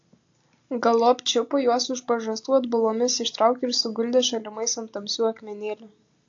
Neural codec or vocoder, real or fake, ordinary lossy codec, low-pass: none; real; AAC, 48 kbps; 7.2 kHz